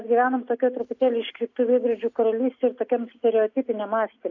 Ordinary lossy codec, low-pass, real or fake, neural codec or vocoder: AAC, 48 kbps; 7.2 kHz; real; none